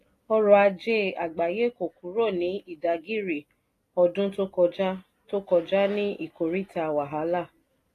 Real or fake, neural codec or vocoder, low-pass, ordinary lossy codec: real; none; 14.4 kHz; AAC, 48 kbps